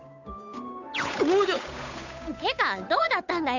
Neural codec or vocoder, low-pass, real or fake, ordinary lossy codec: codec, 16 kHz, 8 kbps, FunCodec, trained on Chinese and English, 25 frames a second; 7.2 kHz; fake; none